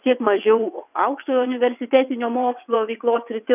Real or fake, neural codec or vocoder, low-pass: fake; vocoder, 22.05 kHz, 80 mel bands, WaveNeXt; 3.6 kHz